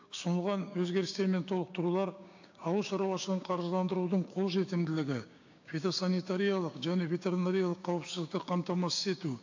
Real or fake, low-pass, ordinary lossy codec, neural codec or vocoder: fake; 7.2 kHz; AAC, 48 kbps; codec, 16 kHz, 6 kbps, DAC